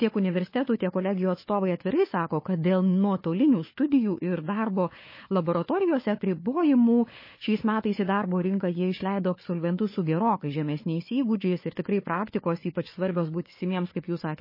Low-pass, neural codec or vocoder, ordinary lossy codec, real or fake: 5.4 kHz; codec, 16 kHz, 4 kbps, FunCodec, trained on Chinese and English, 50 frames a second; MP3, 24 kbps; fake